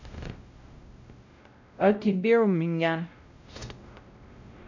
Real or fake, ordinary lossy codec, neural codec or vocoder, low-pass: fake; none; codec, 16 kHz, 0.5 kbps, X-Codec, WavLM features, trained on Multilingual LibriSpeech; 7.2 kHz